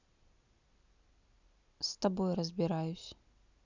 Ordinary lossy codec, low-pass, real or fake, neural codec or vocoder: none; 7.2 kHz; real; none